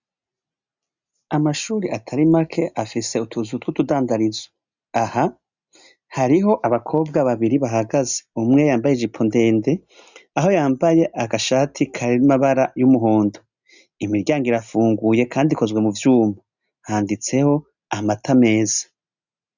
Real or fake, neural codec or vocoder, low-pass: real; none; 7.2 kHz